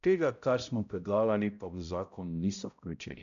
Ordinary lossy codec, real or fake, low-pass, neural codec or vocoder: none; fake; 7.2 kHz; codec, 16 kHz, 0.5 kbps, X-Codec, HuBERT features, trained on balanced general audio